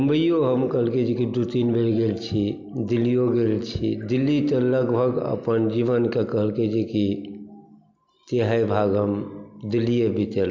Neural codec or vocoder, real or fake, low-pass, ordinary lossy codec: none; real; 7.2 kHz; MP3, 64 kbps